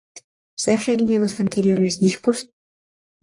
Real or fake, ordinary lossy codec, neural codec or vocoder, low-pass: fake; AAC, 64 kbps; codec, 44.1 kHz, 1.7 kbps, Pupu-Codec; 10.8 kHz